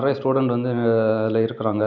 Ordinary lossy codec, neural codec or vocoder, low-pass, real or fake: none; none; 7.2 kHz; real